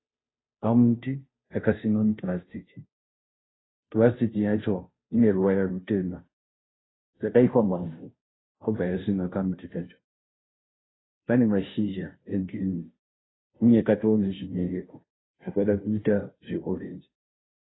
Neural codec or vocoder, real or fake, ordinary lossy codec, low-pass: codec, 16 kHz, 0.5 kbps, FunCodec, trained on Chinese and English, 25 frames a second; fake; AAC, 16 kbps; 7.2 kHz